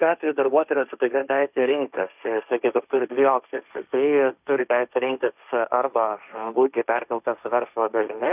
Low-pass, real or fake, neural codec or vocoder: 3.6 kHz; fake; codec, 16 kHz, 1.1 kbps, Voila-Tokenizer